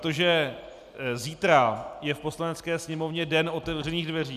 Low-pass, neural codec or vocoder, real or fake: 14.4 kHz; none; real